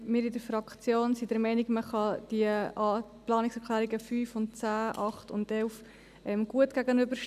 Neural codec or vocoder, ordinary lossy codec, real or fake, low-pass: none; none; real; 14.4 kHz